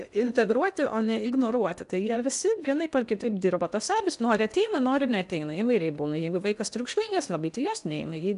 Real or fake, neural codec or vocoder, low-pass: fake; codec, 16 kHz in and 24 kHz out, 0.8 kbps, FocalCodec, streaming, 65536 codes; 10.8 kHz